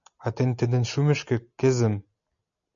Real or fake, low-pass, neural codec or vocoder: real; 7.2 kHz; none